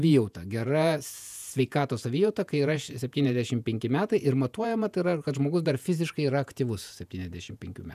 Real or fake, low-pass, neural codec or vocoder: fake; 14.4 kHz; vocoder, 48 kHz, 128 mel bands, Vocos